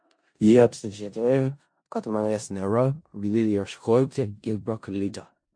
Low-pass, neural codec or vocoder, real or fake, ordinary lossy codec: 9.9 kHz; codec, 16 kHz in and 24 kHz out, 0.4 kbps, LongCat-Audio-Codec, four codebook decoder; fake; MP3, 48 kbps